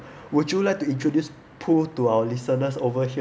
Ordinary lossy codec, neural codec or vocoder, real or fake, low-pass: none; none; real; none